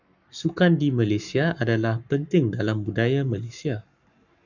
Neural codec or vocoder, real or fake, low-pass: codec, 44.1 kHz, 7.8 kbps, Pupu-Codec; fake; 7.2 kHz